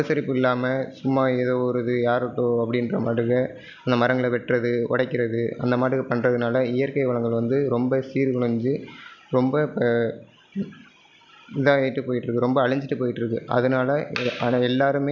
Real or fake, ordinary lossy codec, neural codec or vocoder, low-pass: real; none; none; 7.2 kHz